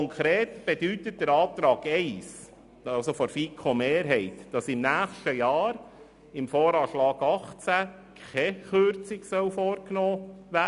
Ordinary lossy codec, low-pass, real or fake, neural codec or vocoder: none; 10.8 kHz; real; none